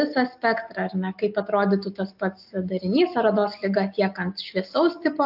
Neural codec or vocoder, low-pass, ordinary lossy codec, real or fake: none; 5.4 kHz; AAC, 48 kbps; real